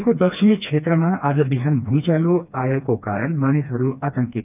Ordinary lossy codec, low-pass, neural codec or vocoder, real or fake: none; 3.6 kHz; codec, 16 kHz, 2 kbps, FreqCodec, smaller model; fake